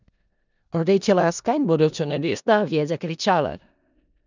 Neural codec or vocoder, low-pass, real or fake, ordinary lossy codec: codec, 16 kHz in and 24 kHz out, 0.4 kbps, LongCat-Audio-Codec, four codebook decoder; 7.2 kHz; fake; none